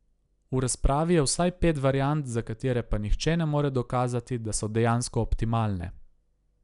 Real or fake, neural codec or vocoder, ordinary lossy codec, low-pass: real; none; none; 10.8 kHz